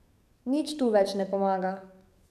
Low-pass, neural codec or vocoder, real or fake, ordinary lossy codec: 14.4 kHz; codec, 44.1 kHz, 7.8 kbps, DAC; fake; none